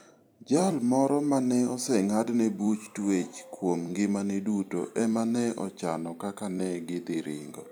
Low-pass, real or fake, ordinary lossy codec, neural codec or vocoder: none; fake; none; vocoder, 44.1 kHz, 128 mel bands every 256 samples, BigVGAN v2